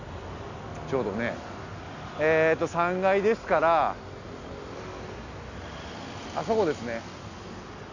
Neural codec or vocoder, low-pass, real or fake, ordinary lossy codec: none; 7.2 kHz; real; none